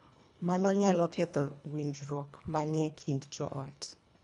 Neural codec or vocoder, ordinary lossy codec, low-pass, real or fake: codec, 24 kHz, 1.5 kbps, HILCodec; none; 10.8 kHz; fake